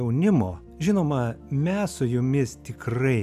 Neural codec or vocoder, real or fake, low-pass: none; real; 14.4 kHz